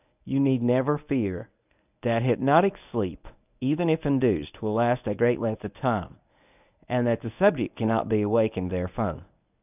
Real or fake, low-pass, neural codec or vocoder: fake; 3.6 kHz; codec, 24 kHz, 0.9 kbps, WavTokenizer, medium speech release version 1